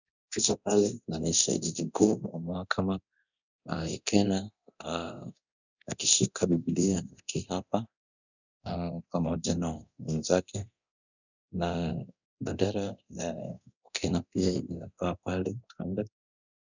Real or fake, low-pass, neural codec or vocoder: fake; 7.2 kHz; codec, 24 kHz, 0.9 kbps, DualCodec